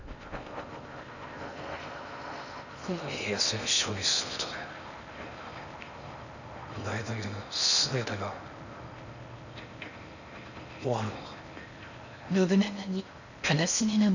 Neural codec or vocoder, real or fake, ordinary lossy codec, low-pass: codec, 16 kHz in and 24 kHz out, 0.6 kbps, FocalCodec, streaming, 4096 codes; fake; none; 7.2 kHz